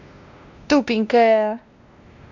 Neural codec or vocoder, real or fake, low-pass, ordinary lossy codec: codec, 16 kHz, 1 kbps, X-Codec, WavLM features, trained on Multilingual LibriSpeech; fake; 7.2 kHz; none